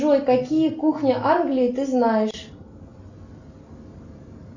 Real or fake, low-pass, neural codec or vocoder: real; 7.2 kHz; none